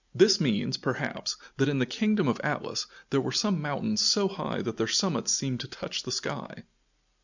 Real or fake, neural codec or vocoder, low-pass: real; none; 7.2 kHz